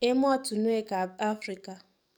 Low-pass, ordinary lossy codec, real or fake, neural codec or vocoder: none; none; fake; codec, 44.1 kHz, 7.8 kbps, DAC